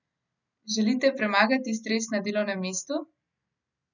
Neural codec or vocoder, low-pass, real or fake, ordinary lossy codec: none; 7.2 kHz; real; none